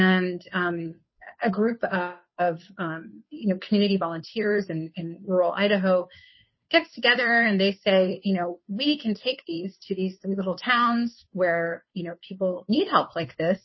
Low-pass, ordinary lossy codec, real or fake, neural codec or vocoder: 7.2 kHz; MP3, 24 kbps; fake; codec, 16 kHz, 2 kbps, FunCodec, trained on Chinese and English, 25 frames a second